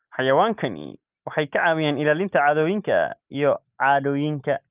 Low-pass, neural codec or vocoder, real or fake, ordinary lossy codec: 3.6 kHz; none; real; Opus, 32 kbps